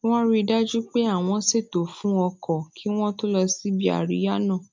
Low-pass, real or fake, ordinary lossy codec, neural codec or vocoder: 7.2 kHz; real; MP3, 64 kbps; none